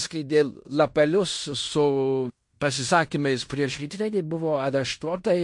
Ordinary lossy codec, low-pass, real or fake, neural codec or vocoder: MP3, 48 kbps; 10.8 kHz; fake; codec, 16 kHz in and 24 kHz out, 0.9 kbps, LongCat-Audio-Codec, fine tuned four codebook decoder